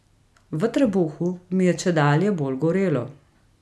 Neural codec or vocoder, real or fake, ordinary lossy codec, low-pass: none; real; none; none